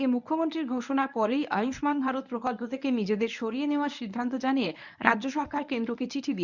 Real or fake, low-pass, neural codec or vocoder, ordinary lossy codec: fake; 7.2 kHz; codec, 24 kHz, 0.9 kbps, WavTokenizer, medium speech release version 1; none